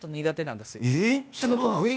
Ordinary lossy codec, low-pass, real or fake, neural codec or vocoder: none; none; fake; codec, 16 kHz, 0.8 kbps, ZipCodec